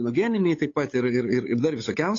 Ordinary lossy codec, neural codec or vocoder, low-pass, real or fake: AAC, 32 kbps; codec, 16 kHz, 8 kbps, FunCodec, trained on Chinese and English, 25 frames a second; 7.2 kHz; fake